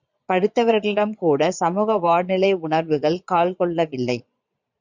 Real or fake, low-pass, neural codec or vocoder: fake; 7.2 kHz; vocoder, 22.05 kHz, 80 mel bands, Vocos